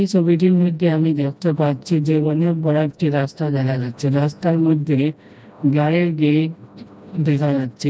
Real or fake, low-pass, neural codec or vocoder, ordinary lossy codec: fake; none; codec, 16 kHz, 1 kbps, FreqCodec, smaller model; none